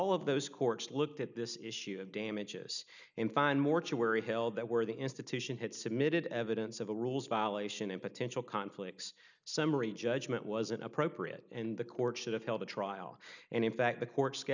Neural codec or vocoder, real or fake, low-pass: none; real; 7.2 kHz